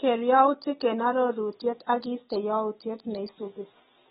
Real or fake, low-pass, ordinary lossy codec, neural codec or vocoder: real; 19.8 kHz; AAC, 16 kbps; none